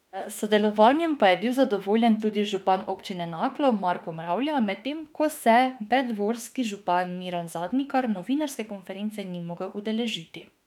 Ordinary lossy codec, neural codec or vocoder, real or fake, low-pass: none; autoencoder, 48 kHz, 32 numbers a frame, DAC-VAE, trained on Japanese speech; fake; 19.8 kHz